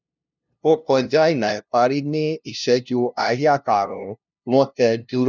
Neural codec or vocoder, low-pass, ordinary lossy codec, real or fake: codec, 16 kHz, 0.5 kbps, FunCodec, trained on LibriTTS, 25 frames a second; 7.2 kHz; none; fake